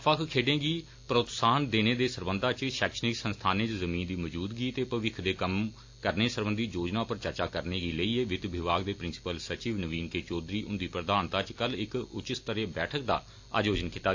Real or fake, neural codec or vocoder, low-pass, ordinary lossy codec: real; none; 7.2 kHz; AAC, 48 kbps